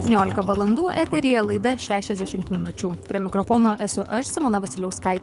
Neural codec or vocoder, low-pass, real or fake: codec, 24 kHz, 3 kbps, HILCodec; 10.8 kHz; fake